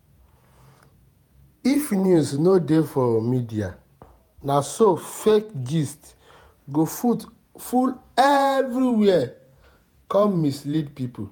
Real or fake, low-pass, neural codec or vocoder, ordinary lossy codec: fake; none; vocoder, 48 kHz, 128 mel bands, Vocos; none